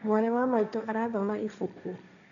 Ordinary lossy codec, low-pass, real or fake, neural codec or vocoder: none; 7.2 kHz; fake; codec, 16 kHz, 1.1 kbps, Voila-Tokenizer